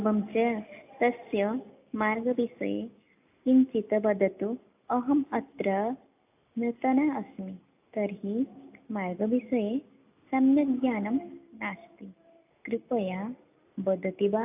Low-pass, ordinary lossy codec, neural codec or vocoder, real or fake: 3.6 kHz; AAC, 32 kbps; none; real